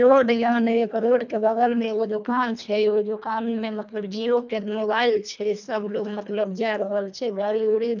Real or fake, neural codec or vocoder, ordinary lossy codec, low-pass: fake; codec, 24 kHz, 1.5 kbps, HILCodec; none; 7.2 kHz